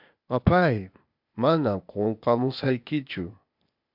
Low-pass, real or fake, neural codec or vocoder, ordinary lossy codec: 5.4 kHz; fake; codec, 16 kHz, 0.8 kbps, ZipCodec; MP3, 48 kbps